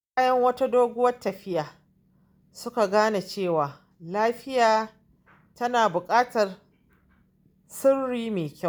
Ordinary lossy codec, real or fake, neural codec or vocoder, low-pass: none; real; none; none